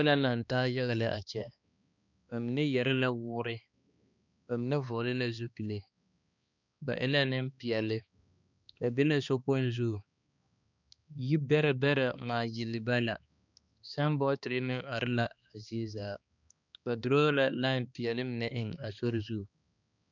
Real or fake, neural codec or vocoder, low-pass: fake; codec, 16 kHz, 2 kbps, X-Codec, HuBERT features, trained on balanced general audio; 7.2 kHz